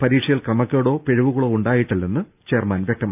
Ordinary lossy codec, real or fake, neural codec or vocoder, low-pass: none; real; none; 3.6 kHz